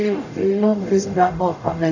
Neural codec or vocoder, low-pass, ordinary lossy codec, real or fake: codec, 44.1 kHz, 0.9 kbps, DAC; 7.2 kHz; none; fake